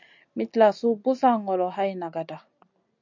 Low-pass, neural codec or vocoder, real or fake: 7.2 kHz; none; real